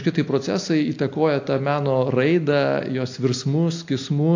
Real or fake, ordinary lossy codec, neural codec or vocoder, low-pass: real; AAC, 48 kbps; none; 7.2 kHz